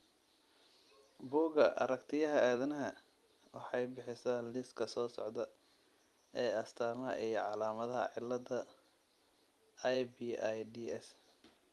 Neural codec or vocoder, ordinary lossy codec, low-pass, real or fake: vocoder, 44.1 kHz, 128 mel bands every 256 samples, BigVGAN v2; Opus, 32 kbps; 19.8 kHz; fake